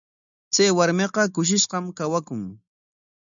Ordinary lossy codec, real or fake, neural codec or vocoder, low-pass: MP3, 96 kbps; real; none; 7.2 kHz